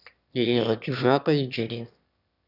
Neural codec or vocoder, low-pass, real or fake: autoencoder, 22.05 kHz, a latent of 192 numbers a frame, VITS, trained on one speaker; 5.4 kHz; fake